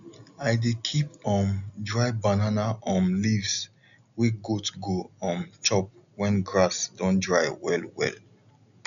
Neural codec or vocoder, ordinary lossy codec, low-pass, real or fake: none; none; 7.2 kHz; real